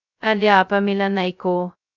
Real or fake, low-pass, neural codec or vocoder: fake; 7.2 kHz; codec, 16 kHz, 0.2 kbps, FocalCodec